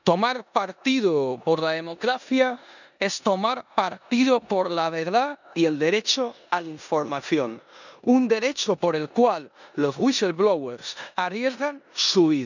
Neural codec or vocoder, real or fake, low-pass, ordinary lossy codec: codec, 16 kHz in and 24 kHz out, 0.9 kbps, LongCat-Audio-Codec, four codebook decoder; fake; 7.2 kHz; none